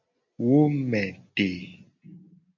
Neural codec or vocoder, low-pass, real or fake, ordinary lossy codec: none; 7.2 kHz; real; MP3, 64 kbps